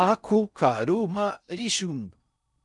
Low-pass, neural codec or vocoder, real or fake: 10.8 kHz; codec, 16 kHz in and 24 kHz out, 0.6 kbps, FocalCodec, streaming, 4096 codes; fake